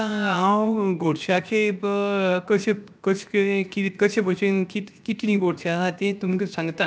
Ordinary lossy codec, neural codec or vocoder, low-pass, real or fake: none; codec, 16 kHz, 0.7 kbps, FocalCodec; none; fake